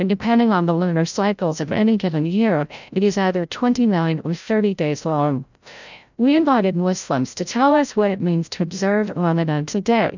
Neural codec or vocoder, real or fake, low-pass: codec, 16 kHz, 0.5 kbps, FreqCodec, larger model; fake; 7.2 kHz